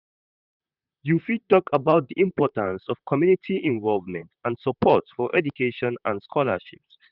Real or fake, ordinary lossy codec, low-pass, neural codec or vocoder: fake; none; 5.4 kHz; vocoder, 44.1 kHz, 128 mel bands, Pupu-Vocoder